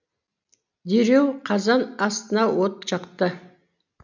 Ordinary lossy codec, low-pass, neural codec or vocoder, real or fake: none; 7.2 kHz; none; real